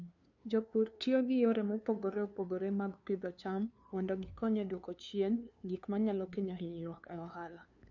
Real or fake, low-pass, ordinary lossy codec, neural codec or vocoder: fake; 7.2 kHz; none; codec, 16 kHz, 2 kbps, FunCodec, trained on LibriTTS, 25 frames a second